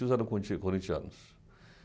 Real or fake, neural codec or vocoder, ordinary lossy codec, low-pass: real; none; none; none